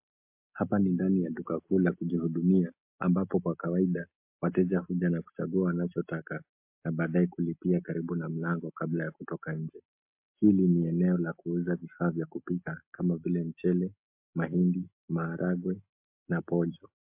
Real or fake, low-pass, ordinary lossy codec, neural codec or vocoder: real; 3.6 kHz; MP3, 32 kbps; none